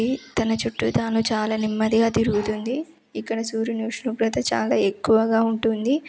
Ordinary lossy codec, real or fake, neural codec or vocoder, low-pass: none; real; none; none